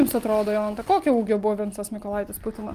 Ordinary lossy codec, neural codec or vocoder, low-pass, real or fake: Opus, 32 kbps; none; 14.4 kHz; real